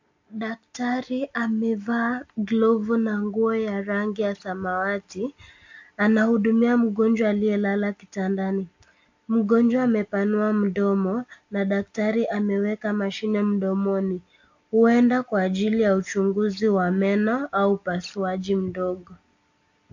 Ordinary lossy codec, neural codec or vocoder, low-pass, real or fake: AAC, 48 kbps; none; 7.2 kHz; real